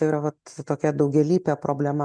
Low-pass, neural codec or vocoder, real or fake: 9.9 kHz; none; real